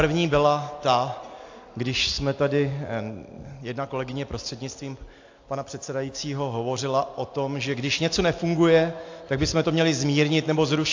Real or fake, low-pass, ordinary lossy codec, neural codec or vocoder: real; 7.2 kHz; AAC, 48 kbps; none